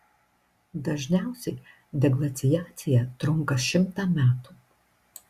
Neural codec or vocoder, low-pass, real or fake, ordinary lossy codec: none; 14.4 kHz; real; Opus, 64 kbps